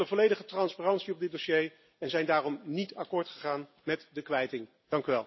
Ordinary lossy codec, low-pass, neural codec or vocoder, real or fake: MP3, 24 kbps; 7.2 kHz; none; real